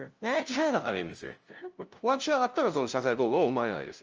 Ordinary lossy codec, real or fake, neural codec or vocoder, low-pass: Opus, 24 kbps; fake; codec, 16 kHz, 0.5 kbps, FunCodec, trained on LibriTTS, 25 frames a second; 7.2 kHz